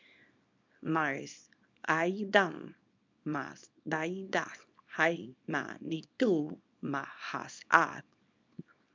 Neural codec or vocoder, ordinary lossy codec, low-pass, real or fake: codec, 24 kHz, 0.9 kbps, WavTokenizer, small release; MP3, 64 kbps; 7.2 kHz; fake